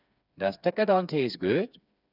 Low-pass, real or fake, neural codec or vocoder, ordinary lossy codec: 5.4 kHz; fake; codec, 16 kHz, 4 kbps, FreqCodec, smaller model; none